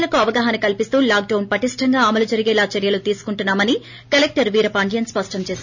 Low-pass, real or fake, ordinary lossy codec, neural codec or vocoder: 7.2 kHz; real; none; none